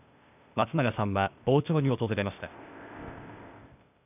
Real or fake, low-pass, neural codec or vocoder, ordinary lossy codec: fake; 3.6 kHz; codec, 16 kHz, 0.8 kbps, ZipCodec; none